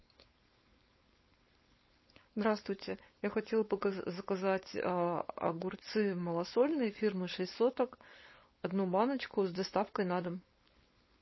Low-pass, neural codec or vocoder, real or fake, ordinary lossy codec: 7.2 kHz; codec, 16 kHz, 4.8 kbps, FACodec; fake; MP3, 24 kbps